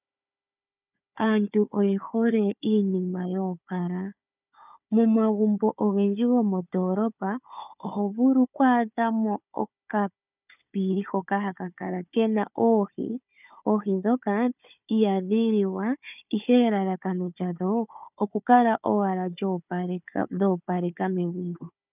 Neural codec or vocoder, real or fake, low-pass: codec, 16 kHz, 4 kbps, FunCodec, trained on Chinese and English, 50 frames a second; fake; 3.6 kHz